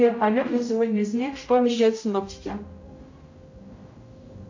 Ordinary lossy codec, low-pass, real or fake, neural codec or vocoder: AAC, 48 kbps; 7.2 kHz; fake; codec, 16 kHz, 0.5 kbps, X-Codec, HuBERT features, trained on general audio